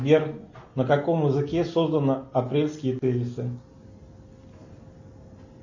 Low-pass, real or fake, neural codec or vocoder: 7.2 kHz; real; none